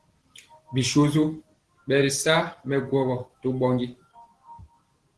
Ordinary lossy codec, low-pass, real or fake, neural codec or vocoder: Opus, 16 kbps; 10.8 kHz; real; none